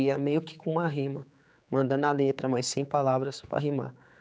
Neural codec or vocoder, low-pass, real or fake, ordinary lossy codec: codec, 16 kHz, 4 kbps, X-Codec, HuBERT features, trained on general audio; none; fake; none